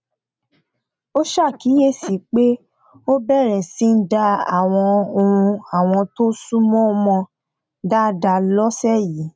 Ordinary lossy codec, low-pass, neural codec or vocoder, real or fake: none; none; none; real